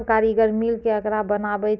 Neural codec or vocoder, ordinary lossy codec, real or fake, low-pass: none; none; real; none